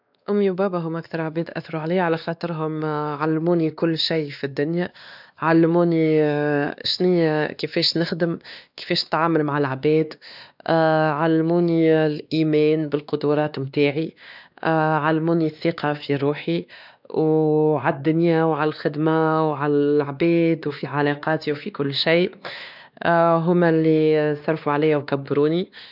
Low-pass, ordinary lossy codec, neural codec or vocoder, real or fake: 5.4 kHz; none; codec, 16 kHz, 2 kbps, X-Codec, WavLM features, trained on Multilingual LibriSpeech; fake